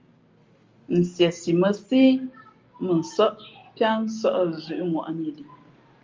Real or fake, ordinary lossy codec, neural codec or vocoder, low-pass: real; Opus, 32 kbps; none; 7.2 kHz